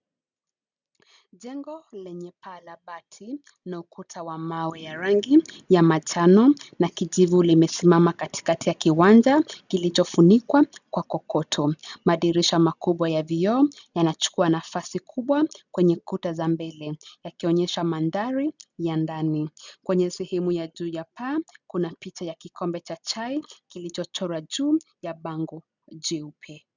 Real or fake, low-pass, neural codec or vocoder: real; 7.2 kHz; none